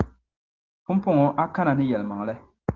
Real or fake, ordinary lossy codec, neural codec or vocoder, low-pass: real; Opus, 16 kbps; none; 7.2 kHz